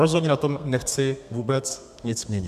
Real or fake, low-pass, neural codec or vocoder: fake; 14.4 kHz; codec, 32 kHz, 1.9 kbps, SNAC